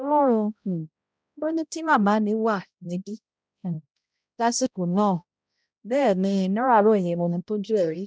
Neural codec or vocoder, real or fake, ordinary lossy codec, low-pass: codec, 16 kHz, 0.5 kbps, X-Codec, HuBERT features, trained on balanced general audio; fake; none; none